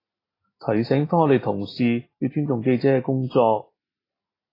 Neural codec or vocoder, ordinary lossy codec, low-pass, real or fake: none; AAC, 24 kbps; 5.4 kHz; real